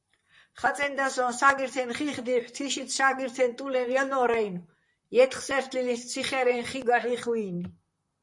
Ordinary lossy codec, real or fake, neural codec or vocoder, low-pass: MP3, 48 kbps; fake; vocoder, 44.1 kHz, 128 mel bands, Pupu-Vocoder; 10.8 kHz